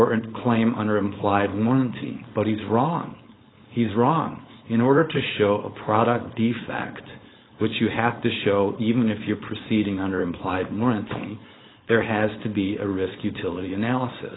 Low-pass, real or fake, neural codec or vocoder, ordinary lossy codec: 7.2 kHz; fake; codec, 16 kHz, 4.8 kbps, FACodec; AAC, 16 kbps